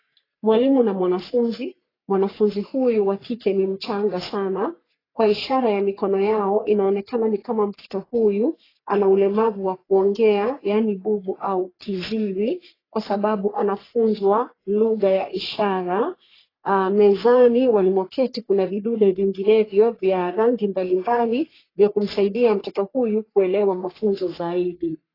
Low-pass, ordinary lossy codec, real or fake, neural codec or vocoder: 5.4 kHz; AAC, 24 kbps; fake; codec, 44.1 kHz, 3.4 kbps, Pupu-Codec